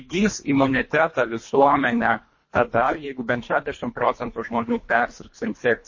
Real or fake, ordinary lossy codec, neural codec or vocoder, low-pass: fake; MP3, 32 kbps; codec, 24 kHz, 1.5 kbps, HILCodec; 7.2 kHz